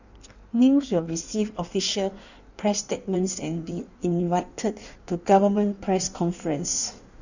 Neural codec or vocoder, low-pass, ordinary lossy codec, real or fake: codec, 16 kHz in and 24 kHz out, 1.1 kbps, FireRedTTS-2 codec; 7.2 kHz; none; fake